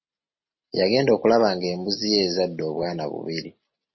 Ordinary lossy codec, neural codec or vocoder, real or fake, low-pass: MP3, 24 kbps; none; real; 7.2 kHz